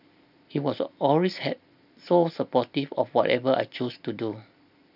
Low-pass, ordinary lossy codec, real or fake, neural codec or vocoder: 5.4 kHz; none; real; none